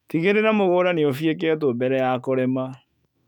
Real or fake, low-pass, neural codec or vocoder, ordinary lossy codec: fake; 19.8 kHz; autoencoder, 48 kHz, 128 numbers a frame, DAC-VAE, trained on Japanese speech; none